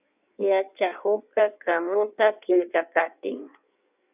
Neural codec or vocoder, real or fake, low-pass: codec, 16 kHz in and 24 kHz out, 1.1 kbps, FireRedTTS-2 codec; fake; 3.6 kHz